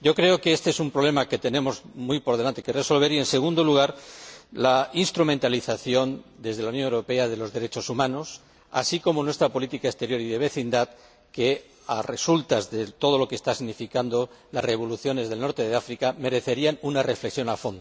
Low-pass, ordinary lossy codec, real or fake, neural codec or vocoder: none; none; real; none